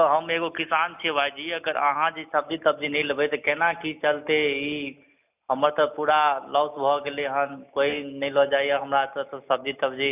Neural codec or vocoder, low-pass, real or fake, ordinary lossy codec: none; 3.6 kHz; real; none